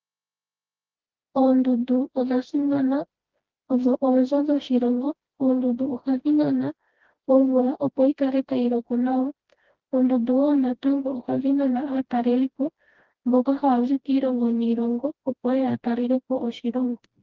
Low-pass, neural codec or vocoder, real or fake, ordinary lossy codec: 7.2 kHz; codec, 16 kHz, 1 kbps, FreqCodec, smaller model; fake; Opus, 16 kbps